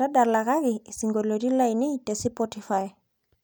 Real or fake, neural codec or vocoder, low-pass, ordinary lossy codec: real; none; none; none